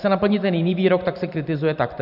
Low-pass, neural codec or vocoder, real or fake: 5.4 kHz; vocoder, 44.1 kHz, 128 mel bands every 512 samples, BigVGAN v2; fake